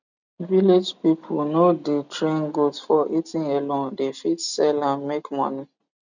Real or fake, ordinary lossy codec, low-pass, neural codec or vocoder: real; none; 7.2 kHz; none